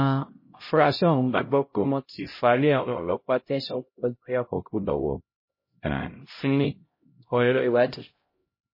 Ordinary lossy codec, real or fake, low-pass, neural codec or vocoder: MP3, 24 kbps; fake; 5.4 kHz; codec, 16 kHz, 0.5 kbps, X-Codec, HuBERT features, trained on LibriSpeech